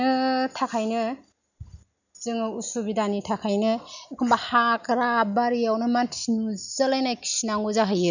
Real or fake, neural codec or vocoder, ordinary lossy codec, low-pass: real; none; none; 7.2 kHz